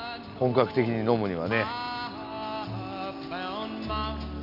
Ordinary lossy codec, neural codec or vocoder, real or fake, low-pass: none; none; real; 5.4 kHz